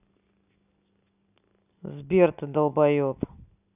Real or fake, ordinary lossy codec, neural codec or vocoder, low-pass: real; none; none; 3.6 kHz